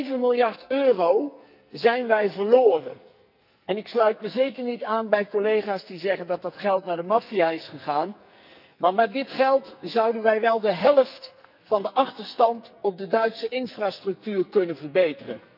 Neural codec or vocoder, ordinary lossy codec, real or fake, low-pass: codec, 44.1 kHz, 2.6 kbps, SNAC; none; fake; 5.4 kHz